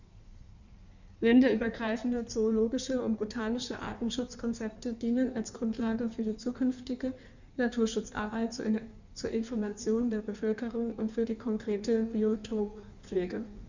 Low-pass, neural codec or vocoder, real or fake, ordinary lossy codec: 7.2 kHz; codec, 16 kHz in and 24 kHz out, 1.1 kbps, FireRedTTS-2 codec; fake; none